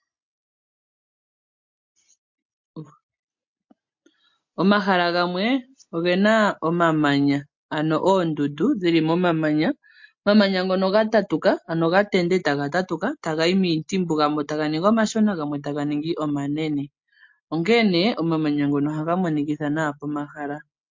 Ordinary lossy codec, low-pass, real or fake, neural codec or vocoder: MP3, 48 kbps; 7.2 kHz; real; none